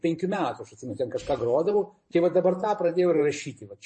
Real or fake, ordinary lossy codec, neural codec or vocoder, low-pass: fake; MP3, 32 kbps; vocoder, 44.1 kHz, 128 mel bands every 512 samples, BigVGAN v2; 10.8 kHz